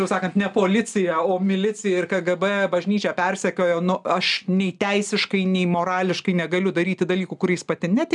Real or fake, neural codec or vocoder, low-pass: real; none; 10.8 kHz